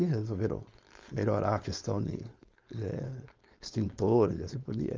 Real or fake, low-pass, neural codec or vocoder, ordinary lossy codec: fake; 7.2 kHz; codec, 16 kHz, 4.8 kbps, FACodec; Opus, 32 kbps